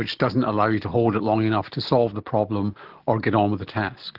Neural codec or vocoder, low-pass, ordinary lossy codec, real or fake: none; 5.4 kHz; Opus, 16 kbps; real